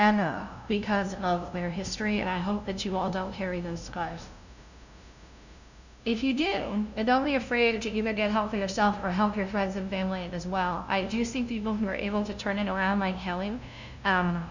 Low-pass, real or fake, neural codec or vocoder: 7.2 kHz; fake; codec, 16 kHz, 0.5 kbps, FunCodec, trained on LibriTTS, 25 frames a second